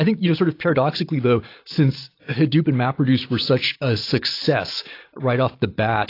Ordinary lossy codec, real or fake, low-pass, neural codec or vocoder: AAC, 32 kbps; real; 5.4 kHz; none